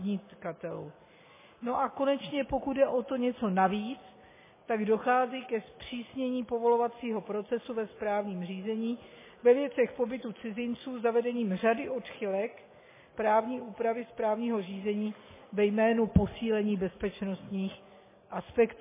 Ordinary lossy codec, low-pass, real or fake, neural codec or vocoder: MP3, 16 kbps; 3.6 kHz; real; none